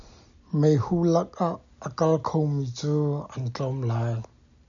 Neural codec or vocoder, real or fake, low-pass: none; real; 7.2 kHz